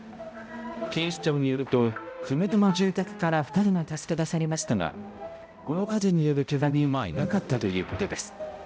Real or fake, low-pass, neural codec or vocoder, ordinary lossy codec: fake; none; codec, 16 kHz, 0.5 kbps, X-Codec, HuBERT features, trained on balanced general audio; none